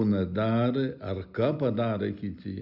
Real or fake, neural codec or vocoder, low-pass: real; none; 5.4 kHz